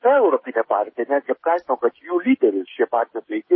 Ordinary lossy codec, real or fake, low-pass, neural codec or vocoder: MP3, 24 kbps; fake; 7.2 kHz; codec, 16 kHz, 16 kbps, FreqCodec, smaller model